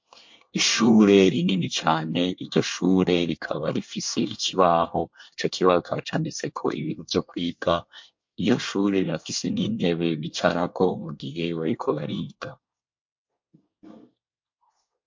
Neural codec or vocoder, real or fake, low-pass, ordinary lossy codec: codec, 24 kHz, 1 kbps, SNAC; fake; 7.2 kHz; MP3, 48 kbps